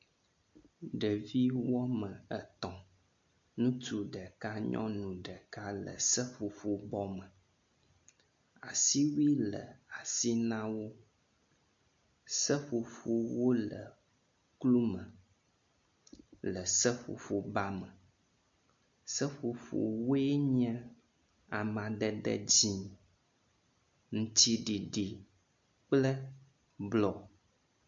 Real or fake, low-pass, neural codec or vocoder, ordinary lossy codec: real; 7.2 kHz; none; MP3, 48 kbps